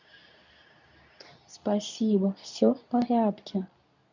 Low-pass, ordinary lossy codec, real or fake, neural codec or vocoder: 7.2 kHz; none; fake; codec, 24 kHz, 0.9 kbps, WavTokenizer, medium speech release version 2